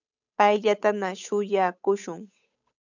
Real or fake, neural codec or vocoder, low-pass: fake; codec, 16 kHz, 8 kbps, FunCodec, trained on Chinese and English, 25 frames a second; 7.2 kHz